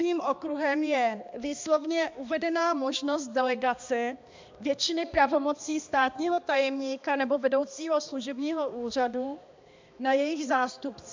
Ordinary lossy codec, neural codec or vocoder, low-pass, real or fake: MP3, 64 kbps; codec, 16 kHz, 2 kbps, X-Codec, HuBERT features, trained on balanced general audio; 7.2 kHz; fake